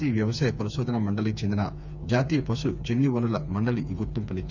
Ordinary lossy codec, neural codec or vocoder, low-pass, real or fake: none; codec, 16 kHz, 4 kbps, FreqCodec, smaller model; 7.2 kHz; fake